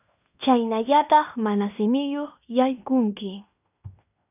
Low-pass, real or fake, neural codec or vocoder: 3.6 kHz; fake; codec, 16 kHz in and 24 kHz out, 0.9 kbps, LongCat-Audio-Codec, fine tuned four codebook decoder